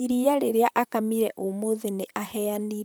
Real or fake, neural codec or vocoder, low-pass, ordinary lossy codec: fake; vocoder, 44.1 kHz, 128 mel bands, Pupu-Vocoder; none; none